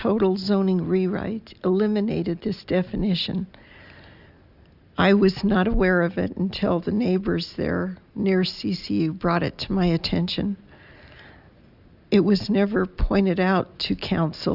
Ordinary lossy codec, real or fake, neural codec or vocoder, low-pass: Opus, 64 kbps; real; none; 5.4 kHz